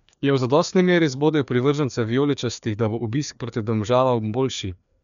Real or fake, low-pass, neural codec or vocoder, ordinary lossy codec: fake; 7.2 kHz; codec, 16 kHz, 2 kbps, FreqCodec, larger model; none